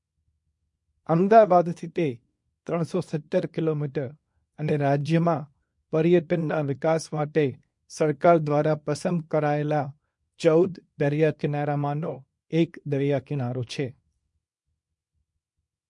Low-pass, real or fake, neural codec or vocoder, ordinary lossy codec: 10.8 kHz; fake; codec, 24 kHz, 0.9 kbps, WavTokenizer, small release; MP3, 48 kbps